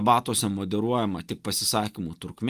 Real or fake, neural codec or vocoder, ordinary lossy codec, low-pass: real; none; Opus, 32 kbps; 14.4 kHz